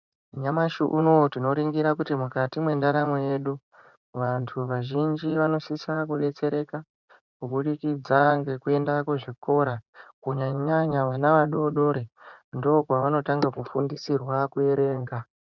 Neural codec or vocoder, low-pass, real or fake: vocoder, 44.1 kHz, 80 mel bands, Vocos; 7.2 kHz; fake